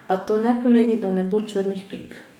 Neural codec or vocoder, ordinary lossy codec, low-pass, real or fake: codec, 44.1 kHz, 2.6 kbps, DAC; none; 19.8 kHz; fake